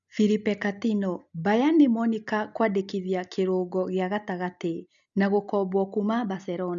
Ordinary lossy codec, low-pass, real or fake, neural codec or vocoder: none; 7.2 kHz; real; none